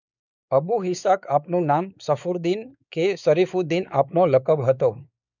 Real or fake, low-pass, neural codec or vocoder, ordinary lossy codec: fake; 7.2 kHz; codec, 16 kHz, 4 kbps, X-Codec, WavLM features, trained on Multilingual LibriSpeech; none